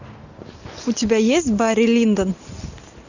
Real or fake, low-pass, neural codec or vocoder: real; 7.2 kHz; none